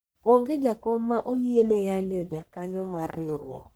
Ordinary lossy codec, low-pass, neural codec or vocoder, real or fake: none; none; codec, 44.1 kHz, 1.7 kbps, Pupu-Codec; fake